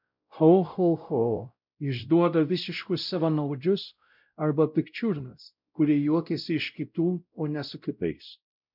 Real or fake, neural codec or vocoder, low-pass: fake; codec, 16 kHz, 0.5 kbps, X-Codec, WavLM features, trained on Multilingual LibriSpeech; 5.4 kHz